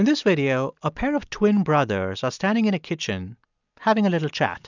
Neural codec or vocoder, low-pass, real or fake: none; 7.2 kHz; real